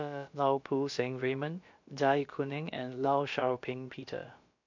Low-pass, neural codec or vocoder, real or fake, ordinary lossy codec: 7.2 kHz; codec, 16 kHz, about 1 kbps, DyCAST, with the encoder's durations; fake; MP3, 48 kbps